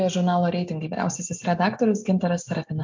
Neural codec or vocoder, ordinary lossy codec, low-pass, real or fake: none; MP3, 64 kbps; 7.2 kHz; real